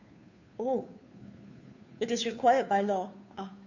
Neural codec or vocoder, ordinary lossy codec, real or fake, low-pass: codec, 16 kHz, 2 kbps, FunCodec, trained on Chinese and English, 25 frames a second; none; fake; 7.2 kHz